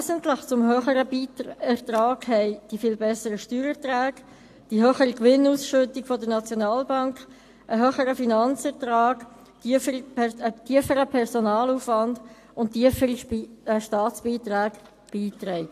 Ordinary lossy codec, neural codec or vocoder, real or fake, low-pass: AAC, 64 kbps; none; real; 14.4 kHz